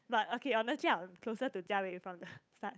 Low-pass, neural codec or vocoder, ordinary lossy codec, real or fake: none; codec, 16 kHz, 8 kbps, FunCodec, trained on Chinese and English, 25 frames a second; none; fake